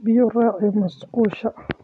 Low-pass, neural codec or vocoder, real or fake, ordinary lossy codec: none; none; real; none